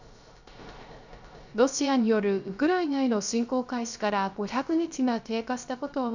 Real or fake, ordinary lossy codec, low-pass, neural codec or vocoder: fake; none; 7.2 kHz; codec, 16 kHz, 0.3 kbps, FocalCodec